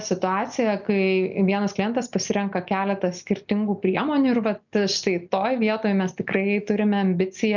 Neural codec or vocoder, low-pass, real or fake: none; 7.2 kHz; real